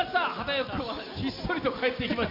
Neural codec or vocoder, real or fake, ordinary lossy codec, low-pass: none; real; AAC, 48 kbps; 5.4 kHz